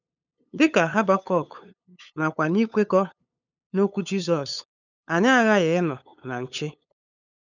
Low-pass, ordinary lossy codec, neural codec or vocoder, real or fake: 7.2 kHz; none; codec, 16 kHz, 8 kbps, FunCodec, trained on LibriTTS, 25 frames a second; fake